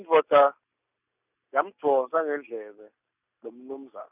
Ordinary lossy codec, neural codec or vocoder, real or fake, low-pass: none; none; real; 3.6 kHz